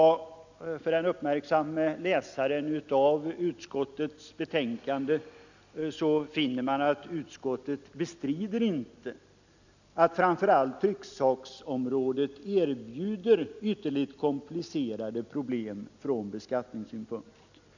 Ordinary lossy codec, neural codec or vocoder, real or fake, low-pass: none; none; real; 7.2 kHz